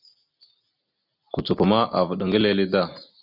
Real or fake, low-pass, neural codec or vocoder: real; 5.4 kHz; none